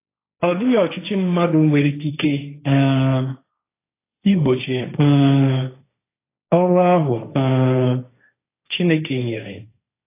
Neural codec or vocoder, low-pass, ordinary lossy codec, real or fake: codec, 16 kHz, 1.1 kbps, Voila-Tokenizer; 3.6 kHz; AAC, 24 kbps; fake